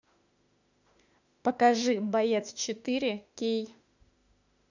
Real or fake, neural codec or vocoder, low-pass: fake; autoencoder, 48 kHz, 32 numbers a frame, DAC-VAE, trained on Japanese speech; 7.2 kHz